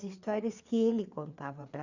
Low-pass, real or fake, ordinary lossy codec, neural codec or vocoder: 7.2 kHz; fake; none; codec, 16 kHz, 4 kbps, FreqCodec, larger model